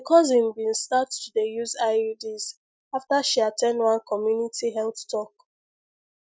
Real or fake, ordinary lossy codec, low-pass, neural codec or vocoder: real; none; none; none